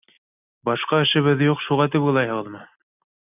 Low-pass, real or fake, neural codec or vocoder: 3.6 kHz; real; none